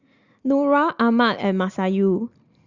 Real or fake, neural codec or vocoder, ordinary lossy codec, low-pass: real; none; Opus, 64 kbps; 7.2 kHz